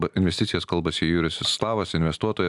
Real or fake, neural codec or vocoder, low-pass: real; none; 10.8 kHz